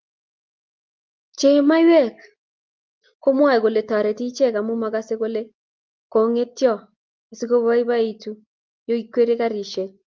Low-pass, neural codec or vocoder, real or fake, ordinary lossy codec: 7.2 kHz; none; real; Opus, 32 kbps